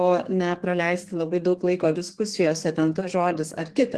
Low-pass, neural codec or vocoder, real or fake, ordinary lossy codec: 10.8 kHz; codec, 32 kHz, 1.9 kbps, SNAC; fake; Opus, 16 kbps